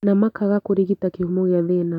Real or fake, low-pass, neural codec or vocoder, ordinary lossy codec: real; 19.8 kHz; none; none